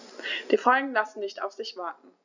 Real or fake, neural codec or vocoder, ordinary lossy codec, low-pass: real; none; none; 7.2 kHz